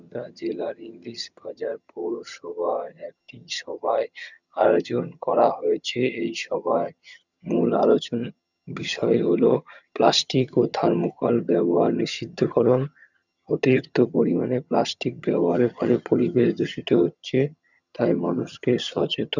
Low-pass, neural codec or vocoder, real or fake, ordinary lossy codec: 7.2 kHz; vocoder, 22.05 kHz, 80 mel bands, HiFi-GAN; fake; none